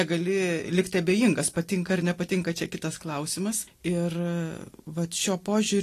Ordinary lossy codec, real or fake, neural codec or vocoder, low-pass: AAC, 48 kbps; real; none; 14.4 kHz